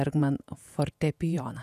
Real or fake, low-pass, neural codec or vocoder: fake; 14.4 kHz; vocoder, 48 kHz, 128 mel bands, Vocos